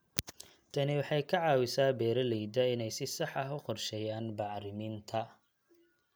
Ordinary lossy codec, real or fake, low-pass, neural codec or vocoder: none; real; none; none